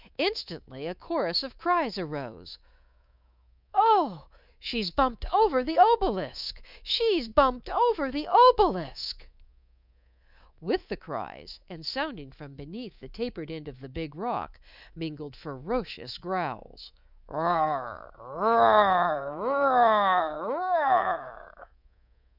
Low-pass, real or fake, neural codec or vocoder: 5.4 kHz; fake; codec, 24 kHz, 3.1 kbps, DualCodec